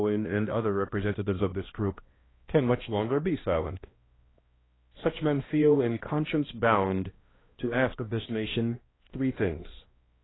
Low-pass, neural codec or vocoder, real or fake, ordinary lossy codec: 7.2 kHz; codec, 16 kHz, 1 kbps, X-Codec, HuBERT features, trained on balanced general audio; fake; AAC, 16 kbps